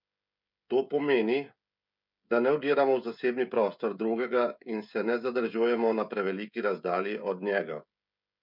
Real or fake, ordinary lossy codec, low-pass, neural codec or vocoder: fake; none; 5.4 kHz; codec, 16 kHz, 16 kbps, FreqCodec, smaller model